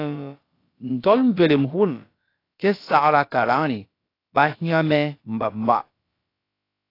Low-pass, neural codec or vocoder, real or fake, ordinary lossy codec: 5.4 kHz; codec, 16 kHz, about 1 kbps, DyCAST, with the encoder's durations; fake; AAC, 32 kbps